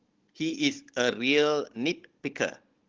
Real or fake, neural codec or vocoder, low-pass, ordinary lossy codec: fake; codec, 16 kHz, 16 kbps, FunCodec, trained on Chinese and English, 50 frames a second; 7.2 kHz; Opus, 16 kbps